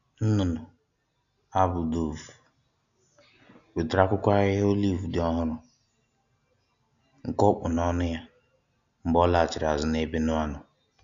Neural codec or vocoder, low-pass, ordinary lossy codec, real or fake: none; 7.2 kHz; none; real